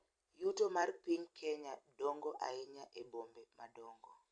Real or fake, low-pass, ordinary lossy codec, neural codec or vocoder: fake; 9.9 kHz; none; vocoder, 44.1 kHz, 128 mel bands every 256 samples, BigVGAN v2